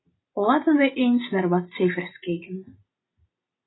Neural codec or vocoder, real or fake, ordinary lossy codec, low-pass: none; real; AAC, 16 kbps; 7.2 kHz